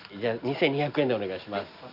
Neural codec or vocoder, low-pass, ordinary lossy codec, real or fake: none; 5.4 kHz; AAC, 48 kbps; real